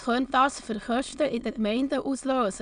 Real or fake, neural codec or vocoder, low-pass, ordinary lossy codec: fake; autoencoder, 22.05 kHz, a latent of 192 numbers a frame, VITS, trained on many speakers; 9.9 kHz; none